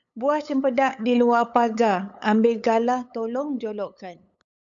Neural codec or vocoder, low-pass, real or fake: codec, 16 kHz, 8 kbps, FunCodec, trained on LibriTTS, 25 frames a second; 7.2 kHz; fake